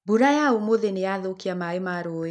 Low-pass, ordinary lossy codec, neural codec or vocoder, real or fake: none; none; none; real